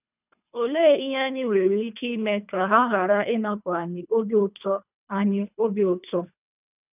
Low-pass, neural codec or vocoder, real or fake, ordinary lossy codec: 3.6 kHz; codec, 24 kHz, 3 kbps, HILCodec; fake; none